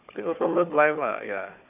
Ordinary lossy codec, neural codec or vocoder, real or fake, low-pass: AAC, 32 kbps; codec, 16 kHz, 4 kbps, FunCodec, trained on Chinese and English, 50 frames a second; fake; 3.6 kHz